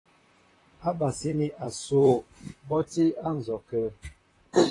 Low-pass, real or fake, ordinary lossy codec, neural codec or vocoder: 10.8 kHz; fake; AAC, 32 kbps; vocoder, 44.1 kHz, 128 mel bands, Pupu-Vocoder